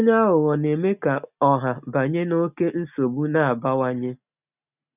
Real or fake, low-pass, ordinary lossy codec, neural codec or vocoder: real; 3.6 kHz; none; none